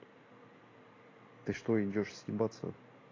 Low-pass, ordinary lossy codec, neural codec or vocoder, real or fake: 7.2 kHz; AAC, 32 kbps; vocoder, 44.1 kHz, 128 mel bands every 256 samples, BigVGAN v2; fake